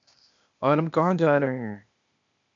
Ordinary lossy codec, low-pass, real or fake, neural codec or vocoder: MP3, 64 kbps; 7.2 kHz; fake; codec, 16 kHz, 0.8 kbps, ZipCodec